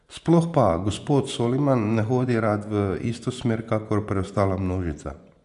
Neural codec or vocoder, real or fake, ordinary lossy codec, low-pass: vocoder, 24 kHz, 100 mel bands, Vocos; fake; none; 10.8 kHz